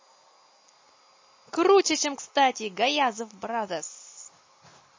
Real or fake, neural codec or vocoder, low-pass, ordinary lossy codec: real; none; 7.2 kHz; MP3, 32 kbps